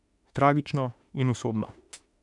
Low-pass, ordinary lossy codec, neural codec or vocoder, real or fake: 10.8 kHz; none; autoencoder, 48 kHz, 32 numbers a frame, DAC-VAE, trained on Japanese speech; fake